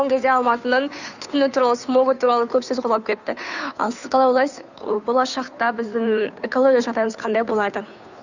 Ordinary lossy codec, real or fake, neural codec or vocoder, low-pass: none; fake; codec, 16 kHz, 2 kbps, FunCodec, trained on Chinese and English, 25 frames a second; 7.2 kHz